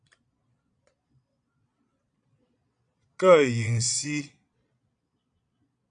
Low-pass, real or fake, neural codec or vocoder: 9.9 kHz; fake; vocoder, 22.05 kHz, 80 mel bands, Vocos